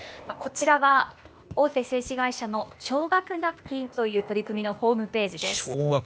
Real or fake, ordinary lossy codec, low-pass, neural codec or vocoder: fake; none; none; codec, 16 kHz, 0.8 kbps, ZipCodec